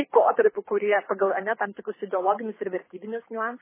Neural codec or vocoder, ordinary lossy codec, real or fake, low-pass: codec, 24 kHz, 6 kbps, HILCodec; MP3, 16 kbps; fake; 3.6 kHz